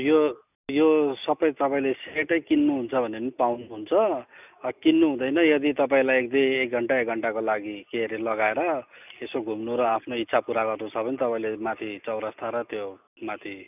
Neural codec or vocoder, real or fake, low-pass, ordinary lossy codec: none; real; 3.6 kHz; none